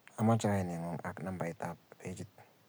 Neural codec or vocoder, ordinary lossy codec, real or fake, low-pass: none; none; real; none